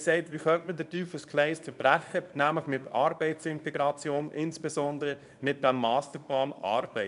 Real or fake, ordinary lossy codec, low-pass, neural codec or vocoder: fake; none; 10.8 kHz; codec, 24 kHz, 0.9 kbps, WavTokenizer, small release